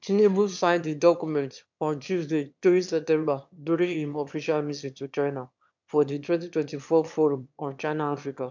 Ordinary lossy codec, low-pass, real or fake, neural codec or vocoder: MP3, 64 kbps; 7.2 kHz; fake; autoencoder, 22.05 kHz, a latent of 192 numbers a frame, VITS, trained on one speaker